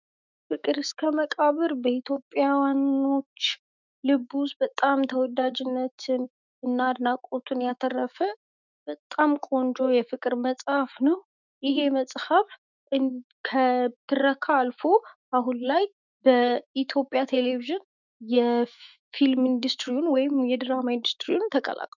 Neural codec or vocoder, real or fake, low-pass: autoencoder, 48 kHz, 128 numbers a frame, DAC-VAE, trained on Japanese speech; fake; 7.2 kHz